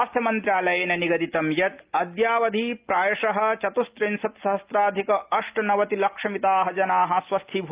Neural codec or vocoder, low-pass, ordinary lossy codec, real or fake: none; 3.6 kHz; Opus, 32 kbps; real